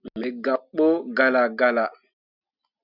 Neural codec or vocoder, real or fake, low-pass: none; real; 5.4 kHz